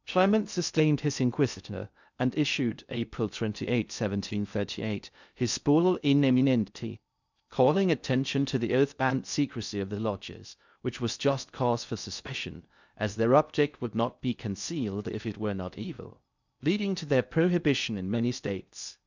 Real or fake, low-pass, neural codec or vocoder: fake; 7.2 kHz; codec, 16 kHz in and 24 kHz out, 0.6 kbps, FocalCodec, streaming, 2048 codes